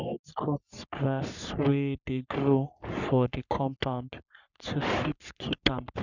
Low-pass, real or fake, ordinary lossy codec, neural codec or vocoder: 7.2 kHz; fake; none; codec, 44.1 kHz, 3.4 kbps, Pupu-Codec